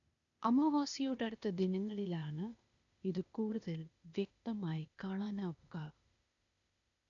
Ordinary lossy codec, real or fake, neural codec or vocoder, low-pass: none; fake; codec, 16 kHz, 0.8 kbps, ZipCodec; 7.2 kHz